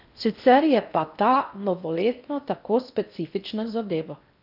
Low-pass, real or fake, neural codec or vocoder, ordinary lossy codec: 5.4 kHz; fake; codec, 16 kHz in and 24 kHz out, 0.6 kbps, FocalCodec, streaming, 4096 codes; none